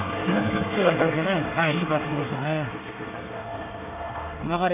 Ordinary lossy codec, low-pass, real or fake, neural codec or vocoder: none; 3.6 kHz; fake; codec, 24 kHz, 1 kbps, SNAC